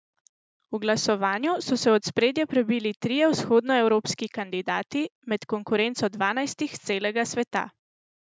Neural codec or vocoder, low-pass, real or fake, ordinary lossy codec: none; none; real; none